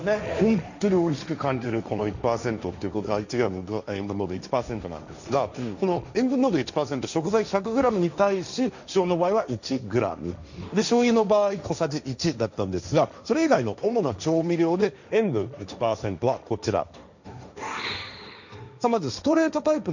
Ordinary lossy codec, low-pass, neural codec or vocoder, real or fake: none; 7.2 kHz; codec, 16 kHz, 1.1 kbps, Voila-Tokenizer; fake